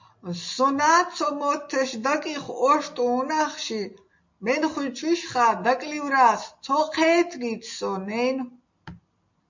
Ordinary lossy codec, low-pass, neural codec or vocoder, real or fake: MP3, 48 kbps; 7.2 kHz; none; real